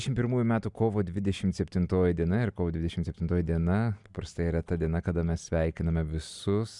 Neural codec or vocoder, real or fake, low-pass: none; real; 10.8 kHz